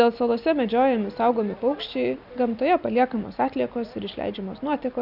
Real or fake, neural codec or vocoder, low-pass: real; none; 5.4 kHz